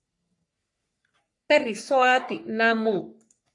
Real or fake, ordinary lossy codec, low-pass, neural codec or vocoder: fake; AAC, 64 kbps; 10.8 kHz; codec, 44.1 kHz, 3.4 kbps, Pupu-Codec